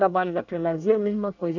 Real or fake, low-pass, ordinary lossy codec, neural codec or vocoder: fake; 7.2 kHz; none; codec, 24 kHz, 1 kbps, SNAC